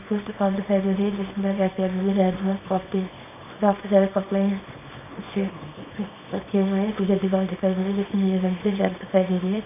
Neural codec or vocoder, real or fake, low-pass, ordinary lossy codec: codec, 24 kHz, 0.9 kbps, WavTokenizer, small release; fake; 3.6 kHz; none